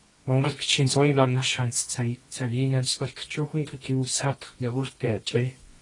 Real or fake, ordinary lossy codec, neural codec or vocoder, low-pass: fake; AAC, 32 kbps; codec, 24 kHz, 0.9 kbps, WavTokenizer, medium music audio release; 10.8 kHz